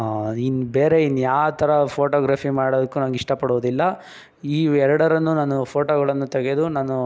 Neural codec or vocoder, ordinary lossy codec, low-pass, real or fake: none; none; none; real